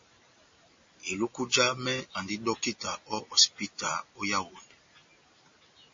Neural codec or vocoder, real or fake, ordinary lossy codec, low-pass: none; real; MP3, 32 kbps; 7.2 kHz